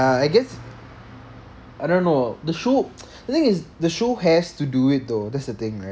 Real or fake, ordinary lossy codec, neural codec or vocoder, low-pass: real; none; none; none